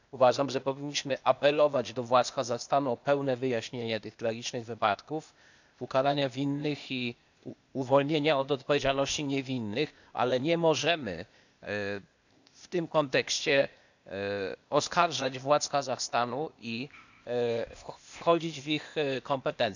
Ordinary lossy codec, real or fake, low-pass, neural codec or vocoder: none; fake; 7.2 kHz; codec, 16 kHz, 0.8 kbps, ZipCodec